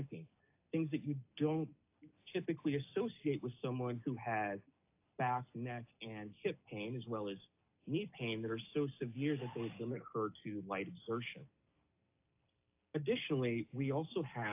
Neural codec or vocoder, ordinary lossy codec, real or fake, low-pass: none; AAC, 32 kbps; real; 3.6 kHz